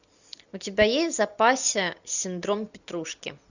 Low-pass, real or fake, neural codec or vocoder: 7.2 kHz; real; none